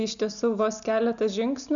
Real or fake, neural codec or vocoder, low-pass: real; none; 7.2 kHz